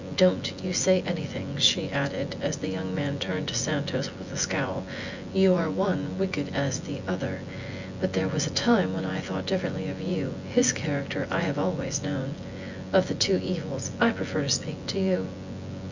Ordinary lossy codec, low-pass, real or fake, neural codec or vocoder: Opus, 64 kbps; 7.2 kHz; fake; vocoder, 24 kHz, 100 mel bands, Vocos